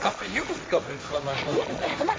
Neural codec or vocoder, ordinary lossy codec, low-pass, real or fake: codec, 16 kHz, 1.1 kbps, Voila-Tokenizer; none; none; fake